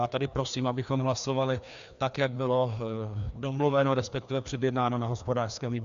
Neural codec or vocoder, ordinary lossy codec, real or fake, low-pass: codec, 16 kHz, 2 kbps, FreqCodec, larger model; AAC, 96 kbps; fake; 7.2 kHz